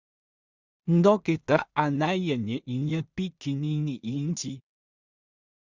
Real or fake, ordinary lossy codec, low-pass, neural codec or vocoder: fake; Opus, 64 kbps; 7.2 kHz; codec, 16 kHz in and 24 kHz out, 0.4 kbps, LongCat-Audio-Codec, two codebook decoder